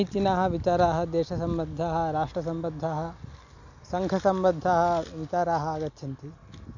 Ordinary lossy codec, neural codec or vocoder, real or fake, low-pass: none; none; real; 7.2 kHz